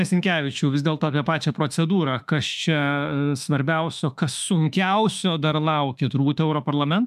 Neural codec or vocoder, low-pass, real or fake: autoencoder, 48 kHz, 32 numbers a frame, DAC-VAE, trained on Japanese speech; 14.4 kHz; fake